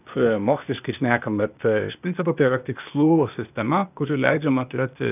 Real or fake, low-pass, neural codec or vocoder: fake; 3.6 kHz; codec, 16 kHz, 0.8 kbps, ZipCodec